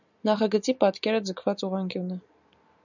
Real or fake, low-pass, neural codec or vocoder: real; 7.2 kHz; none